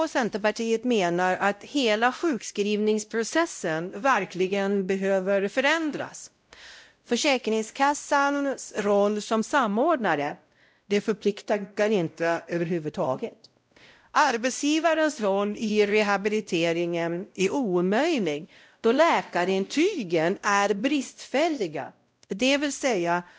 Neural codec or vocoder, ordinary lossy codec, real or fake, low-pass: codec, 16 kHz, 0.5 kbps, X-Codec, WavLM features, trained on Multilingual LibriSpeech; none; fake; none